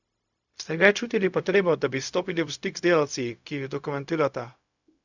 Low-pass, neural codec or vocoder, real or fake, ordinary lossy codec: 7.2 kHz; codec, 16 kHz, 0.4 kbps, LongCat-Audio-Codec; fake; none